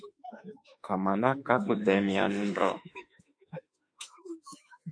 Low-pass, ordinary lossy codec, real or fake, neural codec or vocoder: 9.9 kHz; MP3, 48 kbps; fake; autoencoder, 48 kHz, 32 numbers a frame, DAC-VAE, trained on Japanese speech